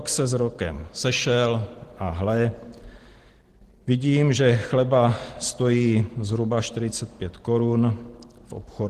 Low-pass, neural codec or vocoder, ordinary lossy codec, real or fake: 14.4 kHz; none; Opus, 16 kbps; real